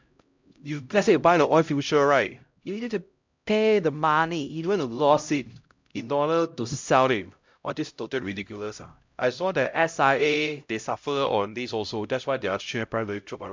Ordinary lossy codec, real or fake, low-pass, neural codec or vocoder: MP3, 64 kbps; fake; 7.2 kHz; codec, 16 kHz, 0.5 kbps, X-Codec, HuBERT features, trained on LibriSpeech